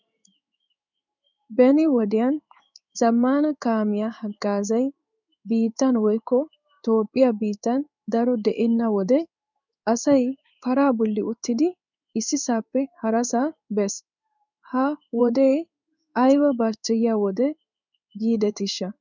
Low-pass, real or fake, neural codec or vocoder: 7.2 kHz; fake; codec, 16 kHz in and 24 kHz out, 1 kbps, XY-Tokenizer